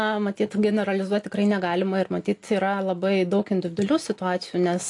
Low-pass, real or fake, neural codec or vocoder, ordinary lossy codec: 10.8 kHz; real; none; AAC, 48 kbps